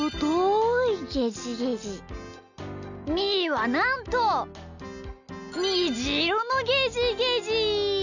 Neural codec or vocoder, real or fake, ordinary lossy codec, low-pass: none; real; none; 7.2 kHz